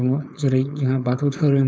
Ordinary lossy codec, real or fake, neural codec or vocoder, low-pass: none; fake; codec, 16 kHz, 4.8 kbps, FACodec; none